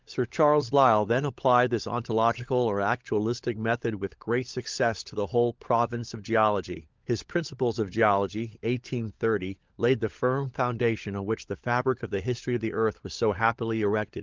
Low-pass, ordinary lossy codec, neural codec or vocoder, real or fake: 7.2 kHz; Opus, 24 kbps; codec, 16 kHz, 16 kbps, FunCodec, trained on LibriTTS, 50 frames a second; fake